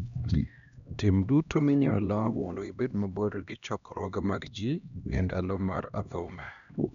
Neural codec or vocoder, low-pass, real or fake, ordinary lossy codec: codec, 16 kHz, 1 kbps, X-Codec, HuBERT features, trained on LibriSpeech; 7.2 kHz; fake; none